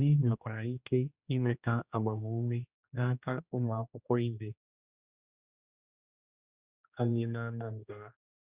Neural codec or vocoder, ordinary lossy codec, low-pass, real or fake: codec, 16 kHz, 1 kbps, X-Codec, HuBERT features, trained on general audio; Opus, 64 kbps; 3.6 kHz; fake